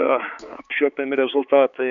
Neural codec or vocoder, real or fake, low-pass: codec, 16 kHz, 4 kbps, X-Codec, HuBERT features, trained on balanced general audio; fake; 7.2 kHz